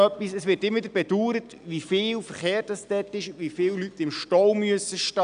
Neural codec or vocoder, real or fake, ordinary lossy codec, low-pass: none; real; none; 9.9 kHz